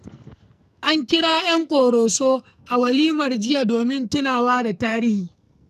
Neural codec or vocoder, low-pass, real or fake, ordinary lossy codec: codec, 44.1 kHz, 2.6 kbps, SNAC; 14.4 kHz; fake; none